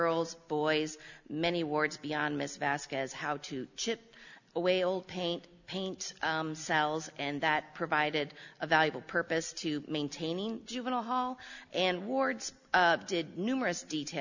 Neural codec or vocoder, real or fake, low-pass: none; real; 7.2 kHz